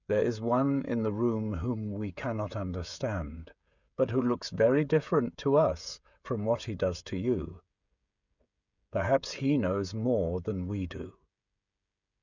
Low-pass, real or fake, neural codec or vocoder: 7.2 kHz; fake; codec, 16 kHz, 16 kbps, FreqCodec, smaller model